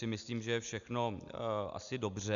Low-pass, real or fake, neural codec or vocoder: 7.2 kHz; real; none